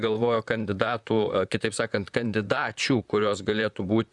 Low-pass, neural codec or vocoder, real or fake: 10.8 kHz; vocoder, 44.1 kHz, 128 mel bands, Pupu-Vocoder; fake